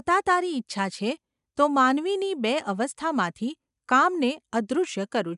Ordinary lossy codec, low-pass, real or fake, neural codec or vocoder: none; 10.8 kHz; real; none